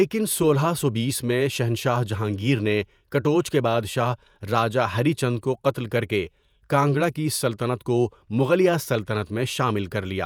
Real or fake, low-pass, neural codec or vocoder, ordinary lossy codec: fake; none; vocoder, 48 kHz, 128 mel bands, Vocos; none